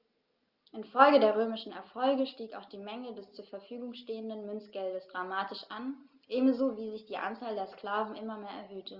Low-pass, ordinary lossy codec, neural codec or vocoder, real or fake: 5.4 kHz; Opus, 24 kbps; none; real